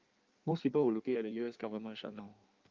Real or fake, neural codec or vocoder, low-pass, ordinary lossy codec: fake; codec, 16 kHz in and 24 kHz out, 1.1 kbps, FireRedTTS-2 codec; 7.2 kHz; Opus, 24 kbps